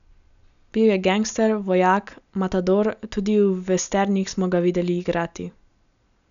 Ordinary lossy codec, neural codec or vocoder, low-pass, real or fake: none; none; 7.2 kHz; real